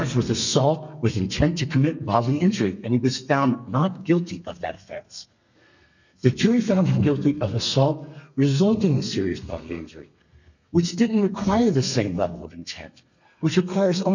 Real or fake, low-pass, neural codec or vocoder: fake; 7.2 kHz; codec, 32 kHz, 1.9 kbps, SNAC